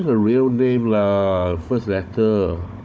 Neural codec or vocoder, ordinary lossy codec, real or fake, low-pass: codec, 16 kHz, 16 kbps, FunCodec, trained on Chinese and English, 50 frames a second; none; fake; none